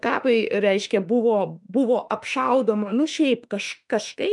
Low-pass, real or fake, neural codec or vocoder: 10.8 kHz; fake; autoencoder, 48 kHz, 32 numbers a frame, DAC-VAE, trained on Japanese speech